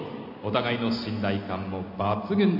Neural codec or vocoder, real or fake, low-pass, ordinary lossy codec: none; real; 5.4 kHz; none